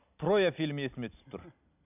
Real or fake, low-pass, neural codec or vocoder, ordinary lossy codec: real; 3.6 kHz; none; none